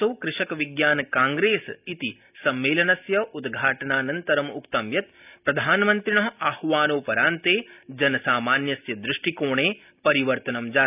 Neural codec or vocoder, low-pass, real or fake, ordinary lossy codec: none; 3.6 kHz; real; none